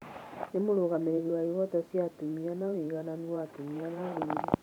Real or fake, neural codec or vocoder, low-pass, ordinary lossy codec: fake; vocoder, 44.1 kHz, 128 mel bands every 512 samples, BigVGAN v2; 19.8 kHz; none